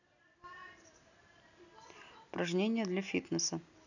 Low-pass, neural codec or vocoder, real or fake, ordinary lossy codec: 7.2 kHz; none; real; none